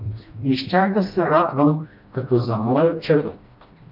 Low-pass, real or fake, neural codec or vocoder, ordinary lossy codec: 5.4 kHz; fake; codec, 16 kHz, 1 kbps, FreqCodec, smaller model; MP3, 48 kbps